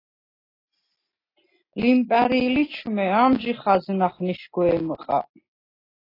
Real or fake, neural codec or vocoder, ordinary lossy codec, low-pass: real; none; AAC, 24 kbps; 5.4 kHz